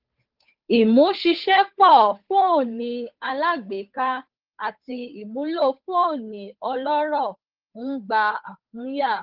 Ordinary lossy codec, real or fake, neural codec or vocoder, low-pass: Opus, 24 kbps; fake; codec, 16 kHz, 8 kbps, FunCodec, trained on Chinese and English, 25 frames a second; 5.4 kHz